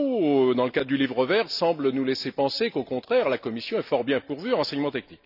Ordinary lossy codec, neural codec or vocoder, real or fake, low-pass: none; none; real; 5.4 kHz